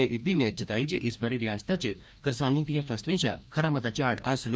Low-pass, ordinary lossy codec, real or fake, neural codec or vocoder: none; none; fake; codec, 16 kHz, 1 kbps, FreqCodec, larger model